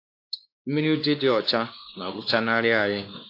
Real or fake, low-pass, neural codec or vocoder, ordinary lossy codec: fake; 5.4 kHz; codec, 16 kHz, 2 kbps, X-Codec, WavLM features, trained on Multilingual LibriSpeech; AAC, 32 kbps